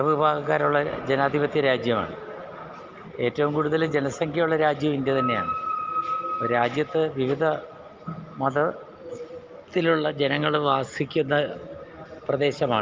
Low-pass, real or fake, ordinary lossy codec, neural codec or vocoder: 7.2 kHz; real; Opus, 24 kbps; none